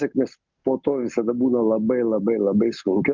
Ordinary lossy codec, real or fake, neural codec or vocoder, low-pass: Opus, 24 kbps; real; none; 7.2 kHz